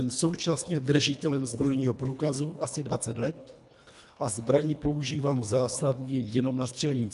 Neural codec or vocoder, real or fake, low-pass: codec, 24 kHz, 1.5 kbps, HILCodec; fake; 10.8 kHz